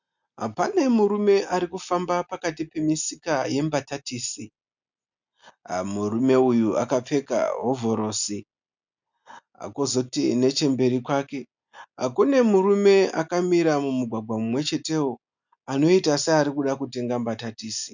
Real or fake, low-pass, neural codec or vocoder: real; 7.2 kHz; none